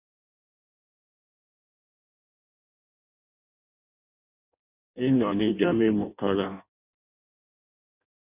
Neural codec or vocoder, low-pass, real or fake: codec, 16 kHz in and 24 kHz out, 0.6 kbps, FireRedTTS-2 codec; 3.6 kHz; fake